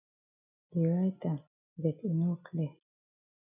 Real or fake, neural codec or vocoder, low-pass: real; none; 3.6 kHz